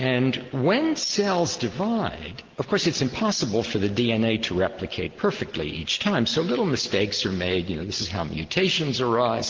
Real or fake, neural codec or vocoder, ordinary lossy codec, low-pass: real; none; Opus, 16 kbps; 7.2 kHz